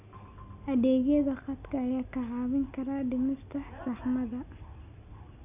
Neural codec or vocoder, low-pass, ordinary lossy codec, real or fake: none; 3.6 kHz; none; real